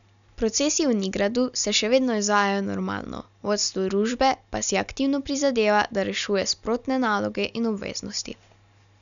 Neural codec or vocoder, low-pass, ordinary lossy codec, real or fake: none; 7.2 kHz; none; real